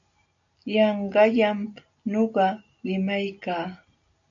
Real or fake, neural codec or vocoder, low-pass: real; none; 7.2 kHz